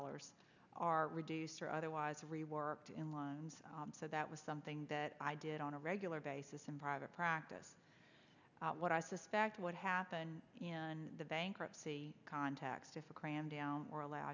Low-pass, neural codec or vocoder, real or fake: 7.2 kHz; none; real